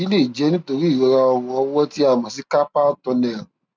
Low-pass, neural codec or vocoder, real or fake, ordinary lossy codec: none; none; real; none